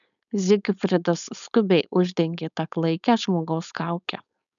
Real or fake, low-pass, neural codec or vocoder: fake; 7.2 kHz; codec, 16 kHz, 4.8 kbps, FACodec